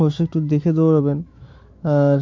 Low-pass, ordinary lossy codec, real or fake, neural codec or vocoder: 7.2 kHz; MP3, 48 kbps; fake; autoencoder, 48 kHz, 128 numbers a frame, DAC-VAE, trained on Japanese speech